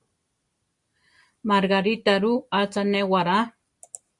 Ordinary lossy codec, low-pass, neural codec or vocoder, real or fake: Opus, 64 kbps; 10.8 kHz; none; real